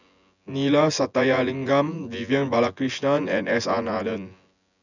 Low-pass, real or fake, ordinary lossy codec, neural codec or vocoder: 7.2 kHz; fake; none; vocoder, 24 kHz, 100 mel bands, Vocos